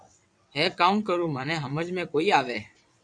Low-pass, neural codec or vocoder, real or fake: 9.9 kHz; vocoder, 22.05 kHz, 80 mel bands, WaveNeXt; fake